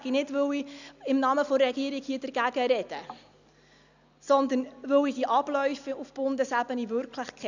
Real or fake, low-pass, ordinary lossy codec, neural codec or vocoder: real; 7.2 kHz; none; none